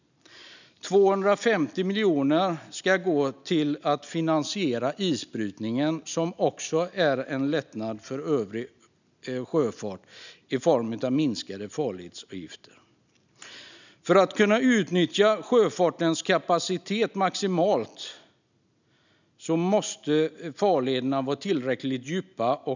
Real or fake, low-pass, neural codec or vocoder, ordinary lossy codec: real; 7.2 kHz; none; none